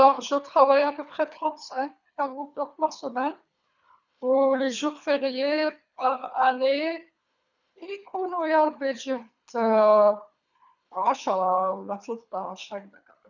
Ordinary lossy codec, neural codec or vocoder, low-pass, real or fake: none; codec, 24 kHz, 3 kbps, HILCodec; 7.2 kHz; fake